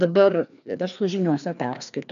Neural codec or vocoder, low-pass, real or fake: codec, 16 kHz, 2 kbps, FreqCodec, larger model; 7.2 kHz; fake